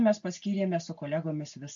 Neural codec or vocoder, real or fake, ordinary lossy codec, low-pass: none; real; AAC, 64 kbps; 7.2 kHz